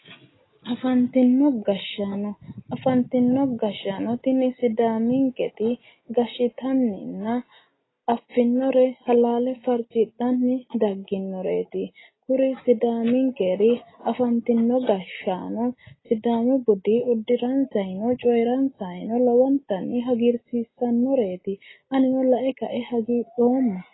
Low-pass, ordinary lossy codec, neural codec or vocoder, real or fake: 7.2 kHz; AAC, 16 kbps; none; real